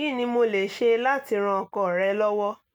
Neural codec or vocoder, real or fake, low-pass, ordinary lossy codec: none; real; 19.8 kHz; none